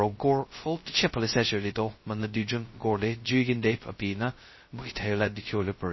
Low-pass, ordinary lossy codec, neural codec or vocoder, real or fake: 7.2 kHz; MP3, 24 kbps; codec, 16 kHz, 0.2 kbps, FocalCodec; fake